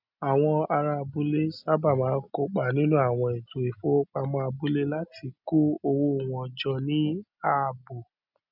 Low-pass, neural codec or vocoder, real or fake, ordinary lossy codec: 5.4 kHz; none; real; none